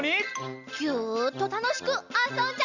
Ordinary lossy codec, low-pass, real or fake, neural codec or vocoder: none; 7.2 kHz; real; none